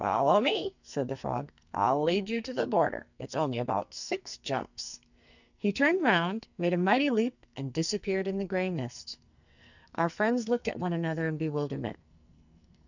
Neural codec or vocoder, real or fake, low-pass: codec, 44.1 kHz, 2.6 kbps, SNAC; fake; 7.2 kHz